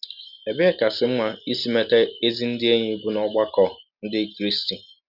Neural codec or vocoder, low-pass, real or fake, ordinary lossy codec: none; 5.4 kHz; real; none